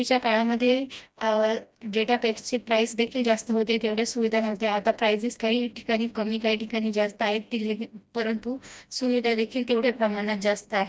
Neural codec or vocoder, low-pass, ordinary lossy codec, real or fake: codec, 16 kHz, 1 kbps, FreqCodec, smaller model; none; none; fake